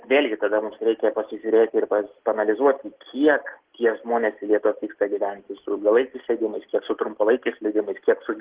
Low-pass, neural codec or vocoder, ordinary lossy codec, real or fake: 3.6 kHz; none; Opus, 16 kbps; real